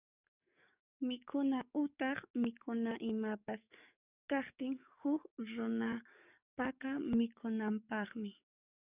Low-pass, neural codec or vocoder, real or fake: 3.6 kHz; codec, 44.1 kHz, 7.8 kbps, DAC; fake